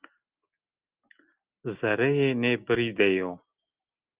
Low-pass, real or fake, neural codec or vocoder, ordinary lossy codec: 3.6 kHz; real; none; Opus, 24 kbps